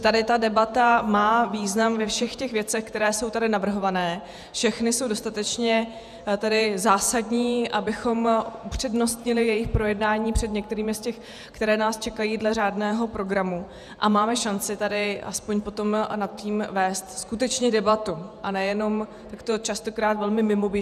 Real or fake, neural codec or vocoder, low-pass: fake; vocoder, 48 kHz, 128 mel bands, Vocos; 14.4 kHz